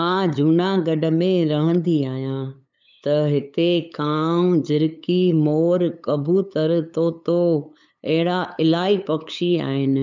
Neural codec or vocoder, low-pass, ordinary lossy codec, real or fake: codec, 16 kHz, 8 kbps, FunCodec, trained on LibriTTS, 25 frames a second; 7.2 kHz; none; fake